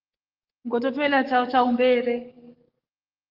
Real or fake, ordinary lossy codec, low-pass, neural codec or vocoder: fake; Opus, 32 kbps; 5.4 kHz; codec, 44.1 kHz, 7.8 kbps, DAC